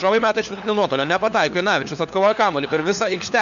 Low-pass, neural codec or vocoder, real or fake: 7.2 kHz; codec, 16 kHz, 4 kbps, FunCodec, trained on LibriTTS, 50 frames a second; fake